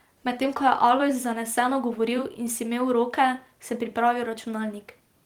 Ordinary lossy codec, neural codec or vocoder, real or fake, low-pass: Opus, 24 kbps; vocoder, 44.1 kHz, 128 mel bands every 256 samples, BigVGAN v2; fake; 19.8 kHz